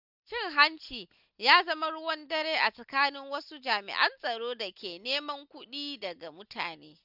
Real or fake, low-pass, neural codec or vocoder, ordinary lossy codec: real; 5.4 kHz; none; none